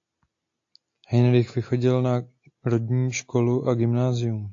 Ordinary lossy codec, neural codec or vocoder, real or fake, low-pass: AAC, 48 kbps; none; real; 7.2 kHz